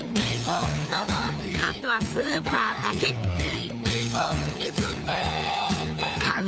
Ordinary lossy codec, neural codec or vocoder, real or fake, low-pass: none; codec, 16 kHz, 4 kbps, FunCodec, trained on LibriTTS, 50 frames a second; fake; none